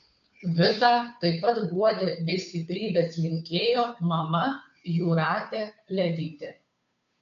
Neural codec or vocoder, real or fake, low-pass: codec, 16 kHz, 2 kbps, FunCodec, trained on Chinese and English, 25 frames a second; fake; 7.2 kHz